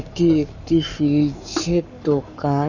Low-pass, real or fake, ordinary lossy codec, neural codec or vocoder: 7.2 kHz; fake; none; codec, 44.1 kHz, 7.8 kbps, Pupu-Codec